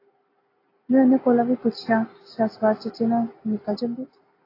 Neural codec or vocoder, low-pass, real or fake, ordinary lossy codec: none; 5.4 kHz; real; AAC, 24 kbps